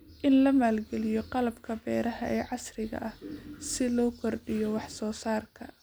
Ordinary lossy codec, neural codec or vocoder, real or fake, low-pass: none; none; real; none